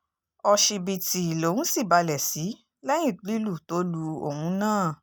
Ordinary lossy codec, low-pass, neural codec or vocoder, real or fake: none; none; none; real